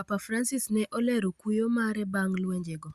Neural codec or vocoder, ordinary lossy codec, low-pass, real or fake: none; Opus, 64 kbps; 14.4 kHz; real